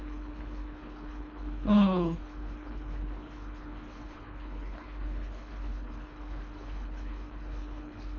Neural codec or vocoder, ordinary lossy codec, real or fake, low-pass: codec, 24 kHz, 3 kbps, HILCodec; none; fake; 7.2 kHz